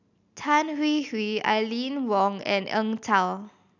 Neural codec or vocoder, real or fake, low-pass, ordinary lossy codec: none; real; 7.2 kHz; none